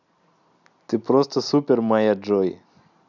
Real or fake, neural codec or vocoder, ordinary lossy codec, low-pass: real; none; none; 7.2 kHz